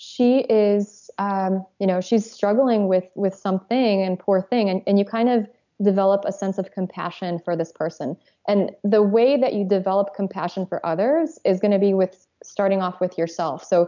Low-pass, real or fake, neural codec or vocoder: 7.2 kHz; real; none